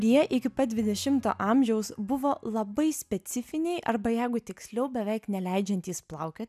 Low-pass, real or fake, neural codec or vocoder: 14.4 kHz; real; none